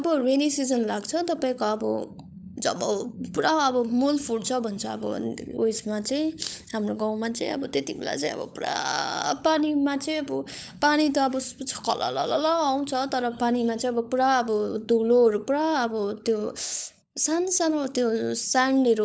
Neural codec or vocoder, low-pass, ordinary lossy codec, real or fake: codec, 16 kHz, 16 kbps, FunCodec, trained on LibriTTS, 50 frames a second; none; none; fake